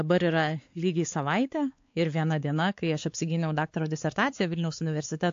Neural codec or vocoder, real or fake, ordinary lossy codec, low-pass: codec, 16 kHz, 4 kbps, X-Codec, WavLM features, trained on Multilingual LibriSpeech; fake; AAC, 48 kbps; 7.2 kHz